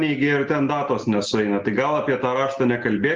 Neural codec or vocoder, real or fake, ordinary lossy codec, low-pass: none; real; Opus, 16 kbps; 7.2 kHz